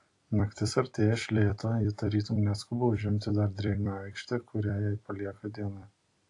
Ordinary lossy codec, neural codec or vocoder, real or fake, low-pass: AAC, 48 kbps; vocoder, 44.1 kHz, 128 mel bands every 256 samples, BigVGAN v2; fake; 10.8 kHz